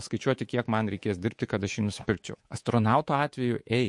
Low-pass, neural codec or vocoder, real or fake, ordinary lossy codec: 10.8 kHz; codec, 24 kHz, 3.1 kbps, DualCodec; fake; MP3, 48 kbps